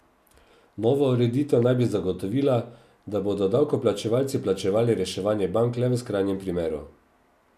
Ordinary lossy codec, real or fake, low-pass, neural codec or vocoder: none; real; 14.4 kHz; none